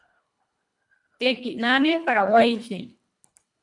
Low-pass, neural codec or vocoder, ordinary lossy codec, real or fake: 10.8 kHz; codec, 24 kHz, 1.5 kbps, HILCodec; MP3, 64 kbps; fake